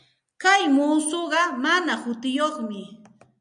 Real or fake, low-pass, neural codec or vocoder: real; 9.9 kHz; none